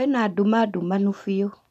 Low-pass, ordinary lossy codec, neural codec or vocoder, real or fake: 14.4 kHz; none; none; real